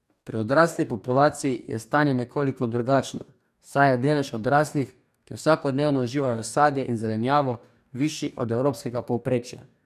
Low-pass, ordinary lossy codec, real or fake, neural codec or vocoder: 14.4 kHz; none; fake; codec, 44.1 kHz, 2.6 kbps, DAC